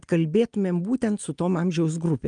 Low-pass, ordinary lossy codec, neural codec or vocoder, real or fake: 9.9 kHz; Opus, 32 kbps; none; real